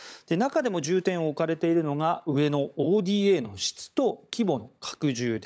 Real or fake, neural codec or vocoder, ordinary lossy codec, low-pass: fake; codec, 16 kHz, 16 kbps, FunCodec, trained on LibriTTS, 50 frames a second; none; none